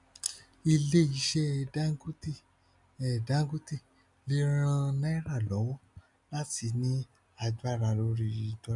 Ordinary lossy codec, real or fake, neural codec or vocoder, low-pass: none; real; none; 10.8 kHz